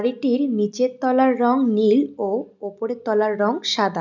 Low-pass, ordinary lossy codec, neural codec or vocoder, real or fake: 7.2 kHz; none; none; real